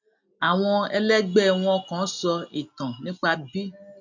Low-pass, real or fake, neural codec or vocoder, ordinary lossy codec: 7.2 kHz; real; none; none